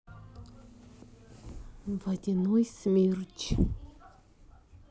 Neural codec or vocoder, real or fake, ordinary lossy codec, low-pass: none; real; none; none